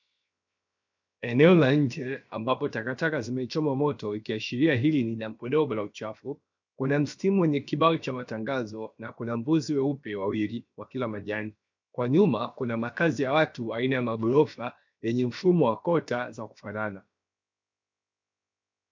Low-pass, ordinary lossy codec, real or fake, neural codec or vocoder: 7.2 kHz; MP3, 64 kbps; fake; codec, 16 kHz, 0.7 kbps, FocalCodec